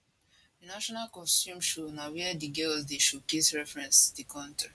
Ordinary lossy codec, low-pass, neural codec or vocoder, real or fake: none; none; none; real